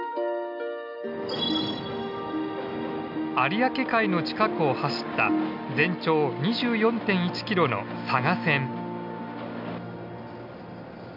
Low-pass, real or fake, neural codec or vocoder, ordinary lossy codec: 5.4 kHz; real; none; none